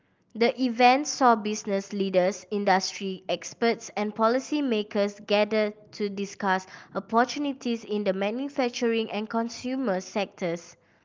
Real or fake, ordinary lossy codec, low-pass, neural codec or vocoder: real; Opus, 24 kbps; 7.2 kHz; none